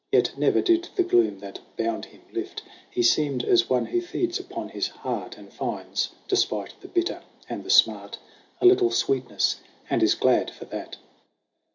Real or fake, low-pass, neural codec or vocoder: real; 7.2 kHz; none